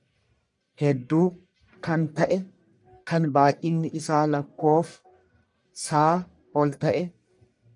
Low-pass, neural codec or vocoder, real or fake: 10.8 kHz; codec, 44.1 kHz, 1.7 kbps, Pupu-Codec; fake